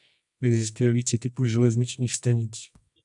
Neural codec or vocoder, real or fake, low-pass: codec, 24 kHz, 0.9 kbps, WavTokenizer, medium music audio release; fake; 10.8 kHz